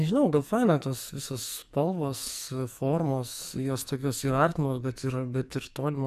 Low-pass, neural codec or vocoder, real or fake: 14.4 kHz; codec, 44.1 kHz, 2.6 kbps, SNAC; fake